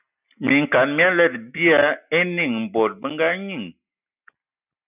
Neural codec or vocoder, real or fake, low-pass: none; real; 3.6 kHz